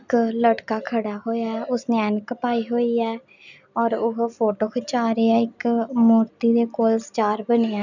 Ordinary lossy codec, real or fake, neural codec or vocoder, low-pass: none; real; none; 7.2 kHz